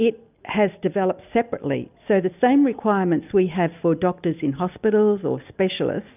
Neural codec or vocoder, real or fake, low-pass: none; real; 3.6 kHz